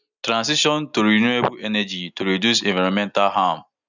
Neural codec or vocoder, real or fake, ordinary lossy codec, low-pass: none; real; none; 7.2 kHz